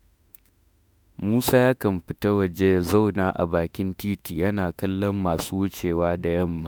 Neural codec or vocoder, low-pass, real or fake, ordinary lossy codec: autoencoder, 48 kHz, 32 numbers a frame, DAC-VAE, trained on Japanese speech; none; fake; none